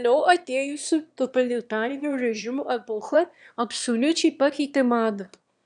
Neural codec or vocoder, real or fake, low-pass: autoencoder, 22.05 kHz, a latent of 192 numbers a frame, VITS, trained on one speaker; fake; 9.9 kHz